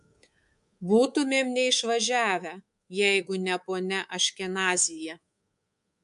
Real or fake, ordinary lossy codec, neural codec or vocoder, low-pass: fake; MP3, 64 kbps; codec, 24 kHz, 3.1 kbps, DualCodec; 10.8 kHz